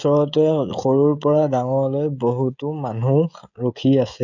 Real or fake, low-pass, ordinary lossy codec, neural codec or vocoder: fake; 7.2 kHz; none; codec, 16 kHz, 16 kbps, FreqCodec, smaller model